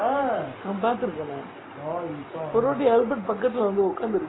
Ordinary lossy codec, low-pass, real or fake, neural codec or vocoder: AAC, 16 kbps; 7.2 kHz; real; none